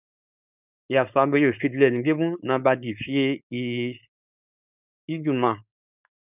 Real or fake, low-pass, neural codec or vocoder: fake; 3.6 kHz; codec, 16 kHz, 4.8 kbps, FACodec